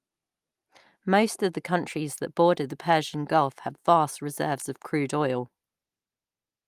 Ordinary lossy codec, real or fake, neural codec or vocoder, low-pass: Opus, 32 kbps; real; none; 14.4 kHz